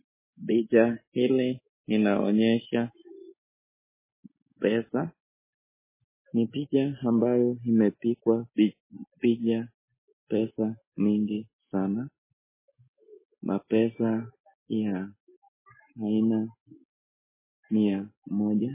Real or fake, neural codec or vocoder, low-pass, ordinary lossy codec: real; none; 3.6 kHz; MP3, 16 kbps